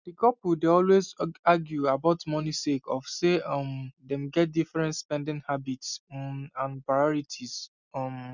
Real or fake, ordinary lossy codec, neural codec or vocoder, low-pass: real; none; none; 7.2 kHz